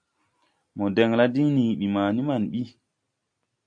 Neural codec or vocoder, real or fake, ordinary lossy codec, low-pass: none; real; AAC, 64 kbps; 9.9 kHz